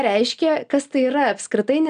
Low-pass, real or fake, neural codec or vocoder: 9.9 kHz; real; none